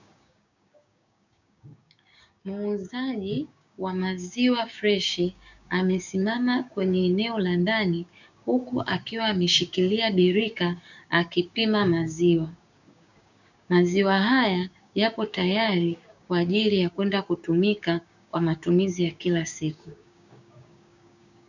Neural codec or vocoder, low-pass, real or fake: codec, 16 kHz, 6 kbps, DAC; 7.2 kHz; fake